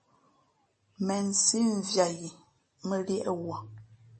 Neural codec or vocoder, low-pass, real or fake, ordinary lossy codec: none; 10.8 kHz; real; MP3, 32 kbps